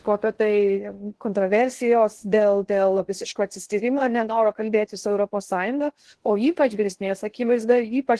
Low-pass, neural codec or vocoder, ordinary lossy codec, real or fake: 10.8 kHz; codec, 16 kHz in and 24 kHz out, 0.6 kbps, FocalCodec, streaming, 2048 codes; Opus, 16 kbps; fake